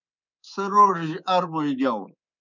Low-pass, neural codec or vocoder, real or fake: 7.2 kHz; codec, 24 kHz, 3.1 kbps, DualCodec; fake